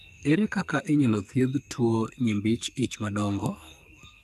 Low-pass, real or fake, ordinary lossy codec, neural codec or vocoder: 14.4 kHz; fake; none; codec, 44.1 kHz, 2.6 kbps, SNAC